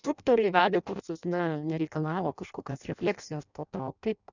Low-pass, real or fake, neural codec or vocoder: 7.2 kHz; fake; codec, 16 kHz in and 24 kHz out, 0.6 kbps, FireRedTTS-2 codec